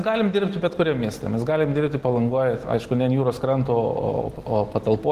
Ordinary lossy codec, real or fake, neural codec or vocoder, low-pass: Opus, 16 kbps; real; none; 14.4 kHz